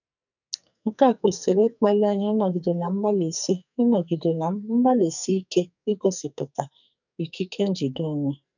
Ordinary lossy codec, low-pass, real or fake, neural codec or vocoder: none; 7.2 kHz; fake; codec, 44.1 kHz, 2.6 kbps, SNAC